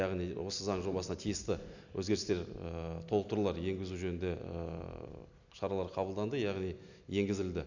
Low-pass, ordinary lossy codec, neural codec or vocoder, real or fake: 7.2 kHz; none; none; real